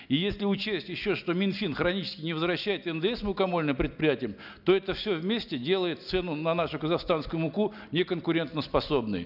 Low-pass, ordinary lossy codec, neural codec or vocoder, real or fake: 5.4 kHz; none; none; real